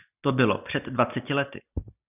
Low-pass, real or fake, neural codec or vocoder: 3.6 kHz; real; none